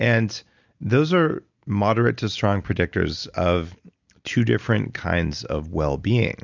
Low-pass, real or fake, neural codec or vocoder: 7.2 kHz; real; none